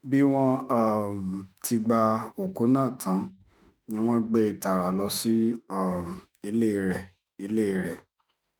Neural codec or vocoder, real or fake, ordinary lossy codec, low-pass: autoencoder, 48 kHz, 32 numbers a frame, DAC-VAE, trained on Japanese speech; fake; none; none